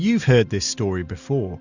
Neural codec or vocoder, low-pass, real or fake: none; 7.2 kHz; real